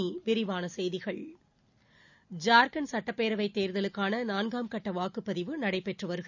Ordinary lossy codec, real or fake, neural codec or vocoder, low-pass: none; real; none; 7.2 kHz